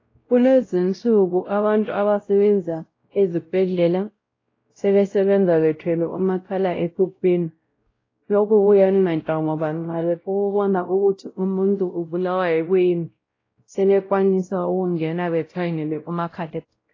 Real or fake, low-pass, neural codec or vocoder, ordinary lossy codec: fake; 7.2 kHz; codec, 16 kHz, 0.5 kbps, X-Codec, WavLM features, trained on Multilingual LibriSpeech; AAC, 32 kbps